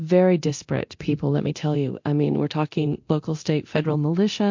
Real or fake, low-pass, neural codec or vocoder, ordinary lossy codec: fake; 7.2 kHz; codec, 24 kHz, 0.9 kbps, DualCodec; MP3, 48 kbps